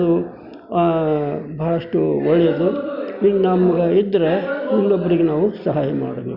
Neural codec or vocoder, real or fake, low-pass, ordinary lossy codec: none; real; 5.4 kHz; Opus, 64 kbps